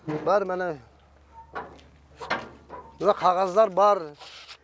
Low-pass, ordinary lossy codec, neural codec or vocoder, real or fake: none; none; none; real